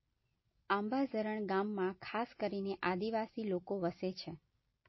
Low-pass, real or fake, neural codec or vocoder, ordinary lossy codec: 7.2 kHz; real; none; MP3, 24 kbps